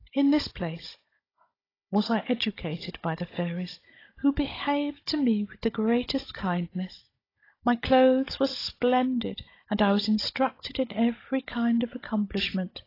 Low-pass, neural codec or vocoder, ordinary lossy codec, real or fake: 5.4 kHz; codec, 16 kHz, 16 kbps, FunCodec, trained on Chinese and English, 50 frames a second; AAC, 24 kbps; fake